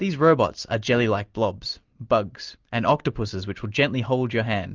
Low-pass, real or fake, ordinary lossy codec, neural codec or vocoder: 7.2 kHz; real; Opus, 32 kbps; none